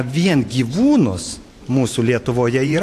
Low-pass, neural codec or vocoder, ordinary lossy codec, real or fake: 14.4 kHz; vocoder, 44.1 kHz, 128 mel bands every 512 samples, BigVGAN v2; AAC, 64 kbps; fake